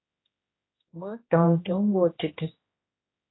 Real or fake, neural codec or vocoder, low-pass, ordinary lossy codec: fake; codec, 16 kHz, 1 kbps, X-Codec, HuBERT features, trained on general audio; 7.2 kHz; AAC, 16 kbps